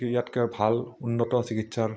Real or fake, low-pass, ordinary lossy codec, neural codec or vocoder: real; none; none; none